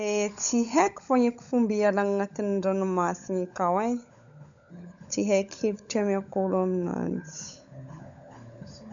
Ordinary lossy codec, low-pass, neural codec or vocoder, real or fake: none; 7.2 kHz; codec, 16 kHz, 16 kbps, FunCodec, trained on LibriTTS, 50 frames a second; fake